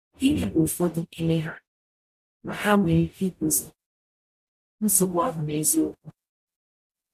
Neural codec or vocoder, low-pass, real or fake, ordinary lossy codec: codec, 44.1 kHz, 0.9 kbps, DAC; 14.4 kHz; fake; none